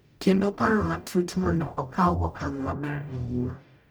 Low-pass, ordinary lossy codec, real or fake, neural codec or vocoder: none; none; fake; codec, 44.1 kHz, 0.9 kbps, DAC